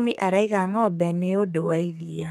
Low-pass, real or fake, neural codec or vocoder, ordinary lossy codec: 14.4 kHz; fake; codec, 32 kHz, 1.9 kbps, SNAC; none